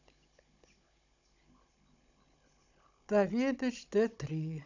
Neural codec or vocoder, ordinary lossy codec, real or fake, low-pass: codec, 16 kHz, 16 kbps, FunCodec, trained on Chinese and English, 50 frames a second; none; fake; 7.2 kHz